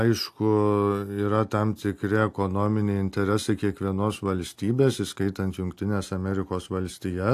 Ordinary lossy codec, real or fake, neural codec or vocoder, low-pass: AAC, 64 kbps; real; none; 14.4 kHz